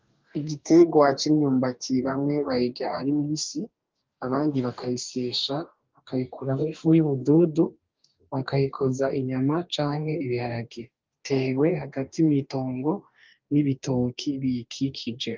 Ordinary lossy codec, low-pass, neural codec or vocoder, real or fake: Opus, 32 kbps; 7.2 kHz; codec, 44.1 kHz, 2.6 kbps, DAC; fake